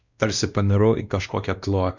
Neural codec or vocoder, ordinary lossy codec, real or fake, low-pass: codec, 16 kHz, 1 kbps, X-Codec, WavLM features, trained on Multilingual LibriSpeech; Opus, 64 kbps; fake; 7.2 kHz